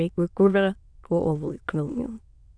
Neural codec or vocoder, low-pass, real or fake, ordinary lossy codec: autoencoder, 22.05 kHz, a latent of 192 numbers a frame, VITS, trained on many speakers; 9.9 kHz; fake; Opus, 64 kbps